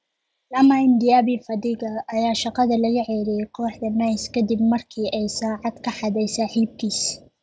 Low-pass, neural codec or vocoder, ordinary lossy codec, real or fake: none; none; none; real